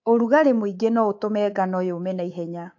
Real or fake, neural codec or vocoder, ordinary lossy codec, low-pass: fake; codec, 24 kHz, 3.1 kbps, DualCodec; AAC, 48 kbps; 7.2 kHz